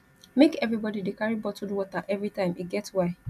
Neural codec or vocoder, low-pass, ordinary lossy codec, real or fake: none; 14.4 kHz; none; real